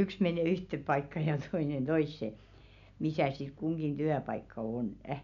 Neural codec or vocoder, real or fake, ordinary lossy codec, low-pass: none; real; none; 7.2 kHz